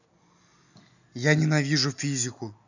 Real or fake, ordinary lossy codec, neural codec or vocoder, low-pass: real; none; none; 7.2 kHz